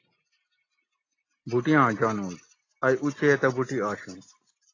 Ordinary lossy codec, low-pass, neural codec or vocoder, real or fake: AAC, 32 kbps; 7.2 kHz; none; real